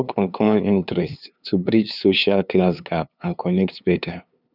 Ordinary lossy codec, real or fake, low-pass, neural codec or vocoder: none; fake; 5.4 kHz; codec, 16 kHz, 2 kbps, FunCodec, trained on LibriTTS, 25 frames a second